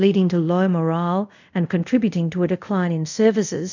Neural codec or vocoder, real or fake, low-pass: codec, 24 kHz, 0.5 kbps, DualCodec; fake; 7.2 kHz